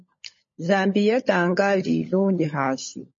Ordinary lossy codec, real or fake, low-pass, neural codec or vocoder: MP3, 32 kbps; fake; 7.2 kHz; codec, 16 kHz, 16 kbps, FunCodec, trained on LibriTTS, 50 frames a second